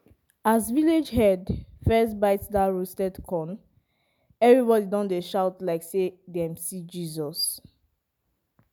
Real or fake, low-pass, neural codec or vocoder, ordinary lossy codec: real; none; none; none